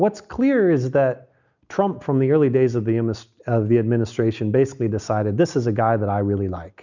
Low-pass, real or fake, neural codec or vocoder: 7.2 kHz; real; none